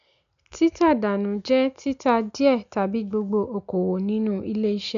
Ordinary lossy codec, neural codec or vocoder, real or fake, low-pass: MP3, 96 kbps; none; real; 7.2 kHz